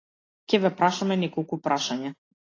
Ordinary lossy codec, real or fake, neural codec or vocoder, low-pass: AAC, 32 kbps; real; none; 7.2 kHz